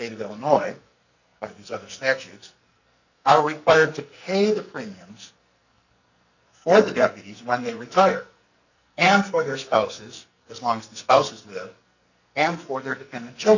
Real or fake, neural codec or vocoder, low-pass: fake; codec, 44.1 kHz, 2.6 kbps, SNAC; 7.2 kHz